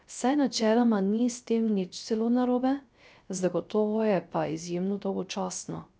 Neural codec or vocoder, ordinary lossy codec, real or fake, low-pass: codec, 16 kHz, 0.3 kbps, FocalCodec; none; fake; none